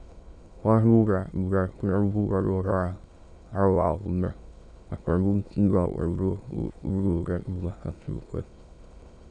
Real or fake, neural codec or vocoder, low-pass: fake; autoencoder, 22.05 kHz, a latent of 192 numbers a frame, VITS, trained on many speakers; 9.9 kHz